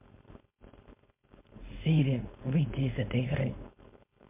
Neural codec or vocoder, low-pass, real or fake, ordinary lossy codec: codec, 16 kHz, 4.8 kbps, FACodec; 3.6 kHz; fake; MP3, 32 kbps